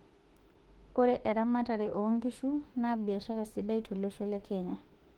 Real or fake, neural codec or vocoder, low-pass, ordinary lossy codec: fake; autoencoder, 48 kHz, 32 numbers a frame, DAC-VAE, trained on Japanese speech; 19.8 kHz; Opus, 16 kbps